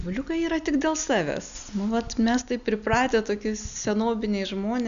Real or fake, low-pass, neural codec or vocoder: real; 7.2 kHz; none